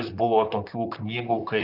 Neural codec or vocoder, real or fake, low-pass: vocoder, 44.1 kHz, 128 mel bands, Pupu-Vocoder; fake; 5.4 kHz